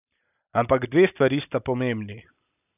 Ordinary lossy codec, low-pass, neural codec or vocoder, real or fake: none; 3.6 kHz; none; real